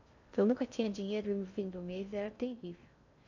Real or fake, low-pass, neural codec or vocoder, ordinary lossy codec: fake; 7.2 kHz; codec, 16 kHz in and 24 kHz out, 0.6 kbps, FocalCodec, streaming, 2048 codes; none